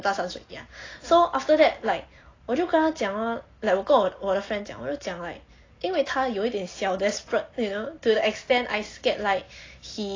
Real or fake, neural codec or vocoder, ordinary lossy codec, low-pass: real; none; AAC, 32 kbps; 7.2 kHz